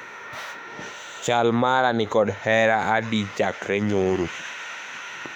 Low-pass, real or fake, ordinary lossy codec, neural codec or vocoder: 19.8 kHz; fake; none; autoencoder, 48 kHz, 32 numbers a frame, DAC-VAE, trained on Japanese speech